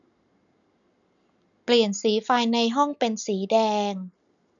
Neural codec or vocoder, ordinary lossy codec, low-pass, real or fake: none; none; 7.2 kHz; real